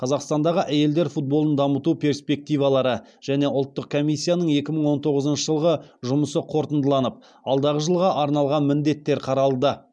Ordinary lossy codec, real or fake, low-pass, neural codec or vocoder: none; real; none; none